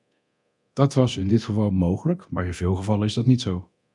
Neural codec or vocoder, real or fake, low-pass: codec, 24 kHz, 0.9 kbps, DualCodec; fake; 10.8 kHz